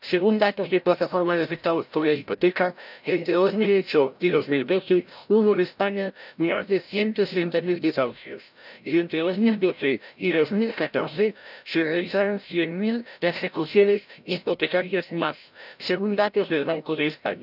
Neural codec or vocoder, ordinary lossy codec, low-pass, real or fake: codec, 16 kHz, 0.5 kbps, FreqCodec, larger model; none; 5.4 kHz; fake